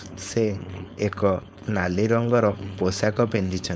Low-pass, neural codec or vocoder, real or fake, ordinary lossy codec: none; codec, 16 kHz, 4.8 kbps, FACodec; fake; none